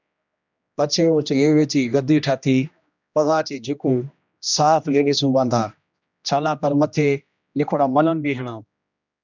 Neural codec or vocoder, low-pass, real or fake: codec, 16 kHz, 1 kbps, X-Codec, HuBERT features, trained on general audio; 7.2 kHz; fake